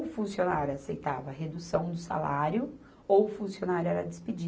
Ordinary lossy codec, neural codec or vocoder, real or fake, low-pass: none; none; real; none